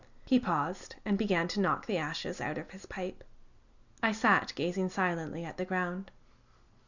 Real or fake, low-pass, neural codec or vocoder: real; 7.2 kHz; none